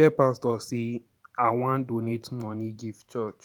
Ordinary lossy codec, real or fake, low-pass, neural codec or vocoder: none; fake; 19.8 kHz; vocoder, 44.1 kHz, 128 mel bands every 512 samples, BigVGAN v2